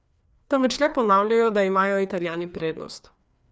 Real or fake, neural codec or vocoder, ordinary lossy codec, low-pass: fake; codec, 16 kHz, 2 kbps, FreqCodec, larger model; none; none